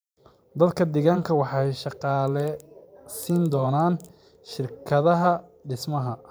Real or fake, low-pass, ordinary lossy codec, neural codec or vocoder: fake; none; none; vocoder, 44.1 kHz, 128 mel bands every 512 samples, BigVGAN v2